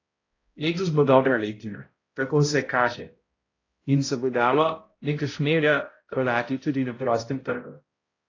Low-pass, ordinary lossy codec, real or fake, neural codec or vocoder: 7.2 kHz; AAC, 32 kbps; fake; codec, 16 kHz, 0.5 kbps, X-Codec, HuBERT features, trained on balanced general audio